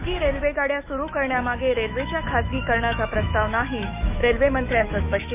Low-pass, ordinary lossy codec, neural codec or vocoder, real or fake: 3.6 kHz; none; autoencoder, 48 kHz, 128 numbers a frame, DAC-VAE, trained on Japanese speech; fake